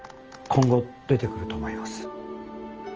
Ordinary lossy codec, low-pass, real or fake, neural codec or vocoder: Opus, 24 kbps; 7.2 kHz; real; none